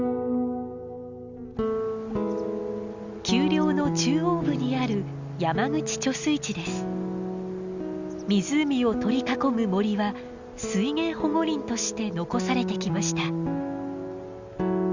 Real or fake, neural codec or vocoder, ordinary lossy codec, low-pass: real; none; Opus, 64 kbps; 7.2 kHz